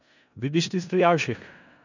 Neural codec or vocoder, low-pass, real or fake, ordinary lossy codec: codec, 16 kHz in and 24 kHz out, 0.4 kbps, LongCat-Audio-Codec, four codebook decoder; 7.2 kHz; fake; none